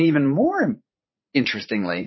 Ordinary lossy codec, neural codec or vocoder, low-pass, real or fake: MP3, 24 kbps; vocoder, 44.1 kHz, 80 mel bands, Vocos; 7.2 kHz; fake